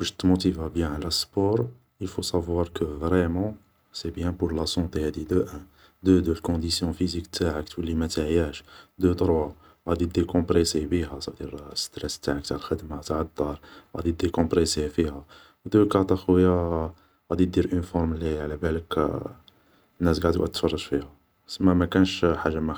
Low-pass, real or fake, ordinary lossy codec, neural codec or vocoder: none; real; none; none